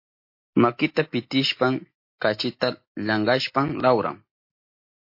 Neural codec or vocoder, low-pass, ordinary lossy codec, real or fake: none; 5.4 kHz; MP3, 32 kbps; real